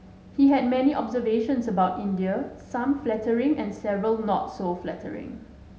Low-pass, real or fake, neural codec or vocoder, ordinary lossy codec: none; real; none; none